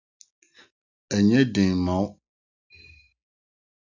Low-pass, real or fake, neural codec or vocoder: 7.2 kHz; real; none